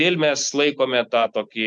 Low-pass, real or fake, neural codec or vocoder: 9.9 kHz; real; none